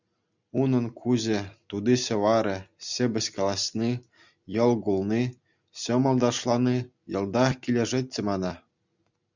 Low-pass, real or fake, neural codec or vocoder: 7.2 kHz; real; none